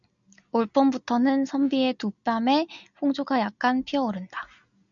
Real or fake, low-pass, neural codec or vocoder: real; 7.2 kHz; none